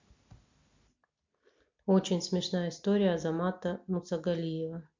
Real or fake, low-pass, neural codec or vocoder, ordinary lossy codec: real; 7.2 kHz; none; MP3, 48 kbps